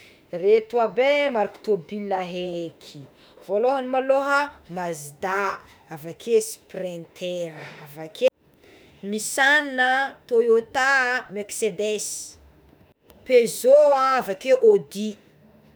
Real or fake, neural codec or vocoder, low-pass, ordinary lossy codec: fake; autoencoder, 48 kHz, 32 numbers a frame, DAC-VAE, trained on Japanese speech; none; none